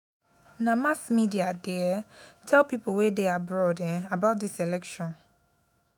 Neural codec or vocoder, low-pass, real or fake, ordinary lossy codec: autoencoder, 48 kHz, 128 numbers a frame, DAC-VAE, trained on Japanese speech; none; fake; none